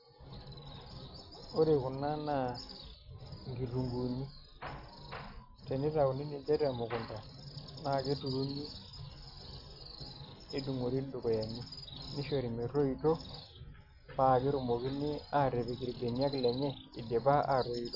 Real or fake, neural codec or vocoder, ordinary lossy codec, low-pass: real; none; none; 5.4 kHz